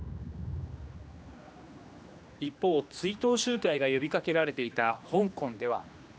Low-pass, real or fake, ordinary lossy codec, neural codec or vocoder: none; fake; none; codec, 16 kHz, 2 kbps, X-Codec, HuBERT features, trained on general audio